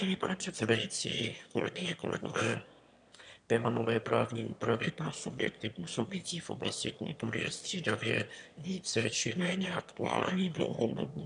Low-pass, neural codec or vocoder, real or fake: 9.9 kHz; autoencoder, 22.05 kHz, a latent of 192 numbers a frame, VITS, trained on one speaker; fake